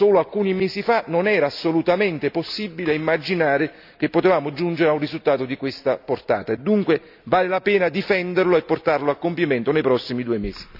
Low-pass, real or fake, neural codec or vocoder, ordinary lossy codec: 5.4 kHz; real; none; none